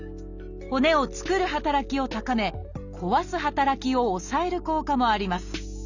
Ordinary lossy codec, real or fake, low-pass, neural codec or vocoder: none; real; 7.2 kHz; none